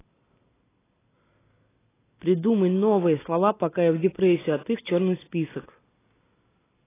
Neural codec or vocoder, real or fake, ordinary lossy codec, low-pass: none; real; AAC, 16 kbps; 3.6 kHz